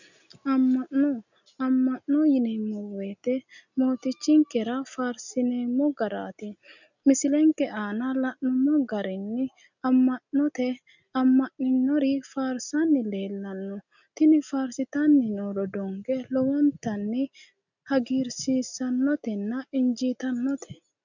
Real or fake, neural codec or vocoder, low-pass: real; none; 7.2 kHz